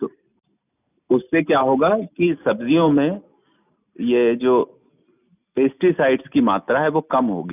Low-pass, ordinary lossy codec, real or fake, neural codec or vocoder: 3.6 kHz; none; real; none